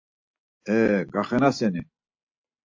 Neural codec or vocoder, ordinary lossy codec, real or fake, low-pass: none; AAC, 48 kbps; real; 7.2 kHz